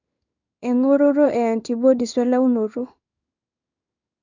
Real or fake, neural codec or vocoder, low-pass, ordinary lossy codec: fake; codec, 16 kHz in and 24 kHz out, 1 kbps, XY-Tokenizer; 7.2 kHz; none